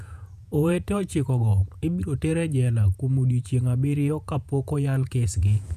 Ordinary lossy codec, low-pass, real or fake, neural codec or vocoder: none; 14.4 kHz; fake; vocoder, 48 kHz, 128 mel bands, Vocos